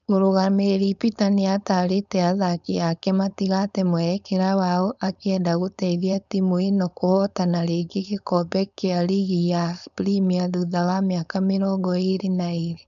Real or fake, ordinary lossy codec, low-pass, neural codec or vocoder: fake; none; 7.2 kHz; codec, 16 kHz, 4.8 kbps, FACodec